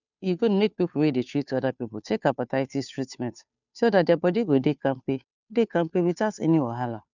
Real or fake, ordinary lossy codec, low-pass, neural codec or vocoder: fake; none; 7.2 kHz; codec, 16 kHz, 2 kbps, FunCodec, trained on Chinese and English, 25 frames a second